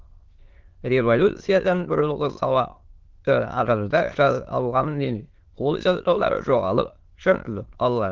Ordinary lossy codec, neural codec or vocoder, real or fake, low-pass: Opus, 16 kbps; autoencoder, 22.05 kHz, a latent of 192 numbers a frame, VITS, trained on many speakers; fake; 7.2 kHz